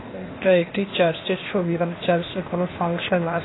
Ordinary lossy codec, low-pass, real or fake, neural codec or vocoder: AAC, 16 kbps; 7.2 kHz; fake; codec, 16 kHz, 0.8 kbps, ZipCodec